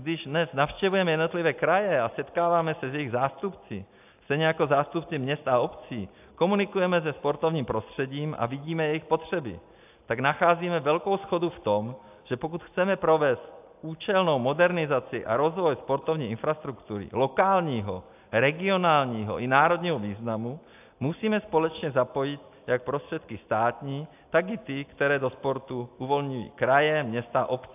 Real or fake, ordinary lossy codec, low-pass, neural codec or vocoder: real; AAC, 32 kbps; 3.6 kHz; none